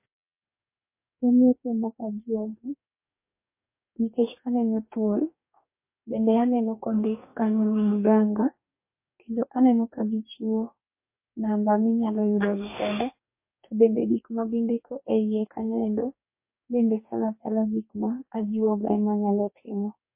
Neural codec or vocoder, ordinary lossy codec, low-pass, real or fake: codec, 44.1 kHz, 2.6 kbps, DAC; MP3, 24 kbps; 3.6 kHz; fake